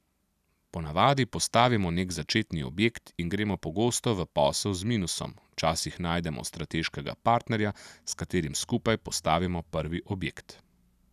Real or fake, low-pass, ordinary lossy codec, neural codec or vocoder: real; 14.4 kHz; none; none